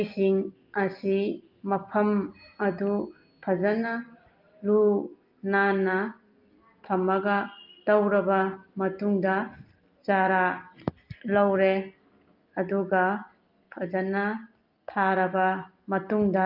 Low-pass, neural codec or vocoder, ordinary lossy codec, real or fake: 5.4 kHz; none; Opus, 24 kbps; real